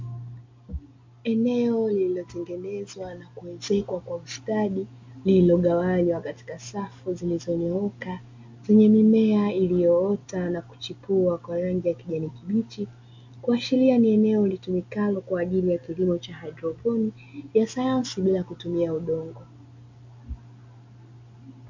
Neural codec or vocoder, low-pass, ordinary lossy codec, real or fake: none; 7.2 kHz; MP3, 48 kbps; real